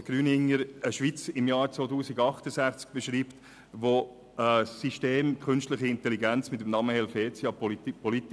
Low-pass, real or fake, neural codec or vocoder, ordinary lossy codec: none; real; none; none